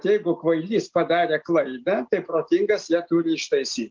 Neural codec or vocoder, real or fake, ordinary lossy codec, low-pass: none; real; Opus, 24 kbps; 7.2 kHz